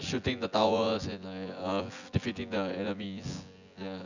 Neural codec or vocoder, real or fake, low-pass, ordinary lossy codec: vocoder, 24 kHz, 100 mel bands, Vocos; fake; 7.2 kHz; none